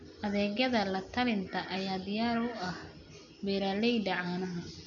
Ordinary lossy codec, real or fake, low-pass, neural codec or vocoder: none; real; 7.2 kHz; none